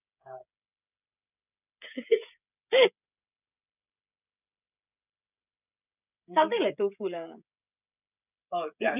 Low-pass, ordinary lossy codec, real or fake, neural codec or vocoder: 3.6 kHz; none; fake; codec, 16 kHz, 16 kbps, FreqCodec, smaller model